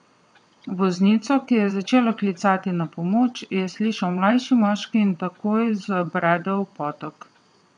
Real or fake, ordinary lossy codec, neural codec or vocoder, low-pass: fake; none; vocoder, 22.05 kHz, 80 mel bands, WaveNeXt; 9.9 kHz